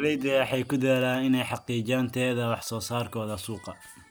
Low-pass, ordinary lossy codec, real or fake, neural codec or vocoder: none; none; real; none